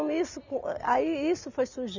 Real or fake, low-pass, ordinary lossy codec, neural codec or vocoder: real; 7.2 kHz; none; none